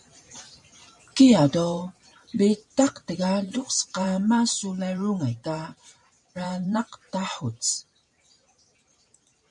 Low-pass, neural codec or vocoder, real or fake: 10.8 kHz; vocoder, 44.1 kHz, 128 mel bands every 512 samples, BigVGAN v2; fake